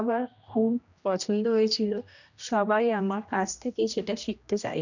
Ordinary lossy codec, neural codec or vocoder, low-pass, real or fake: none; codec, 16 kHz, 1 kbps, X-Codec, HuBERT features, trained on general audio; 7.2 kHz; fake